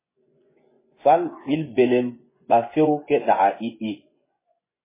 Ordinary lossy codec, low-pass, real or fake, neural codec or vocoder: MP3, 16 kbps; 3.6 kHz; fake; vocoder, 24 kHz, 100 mel bands, Vocos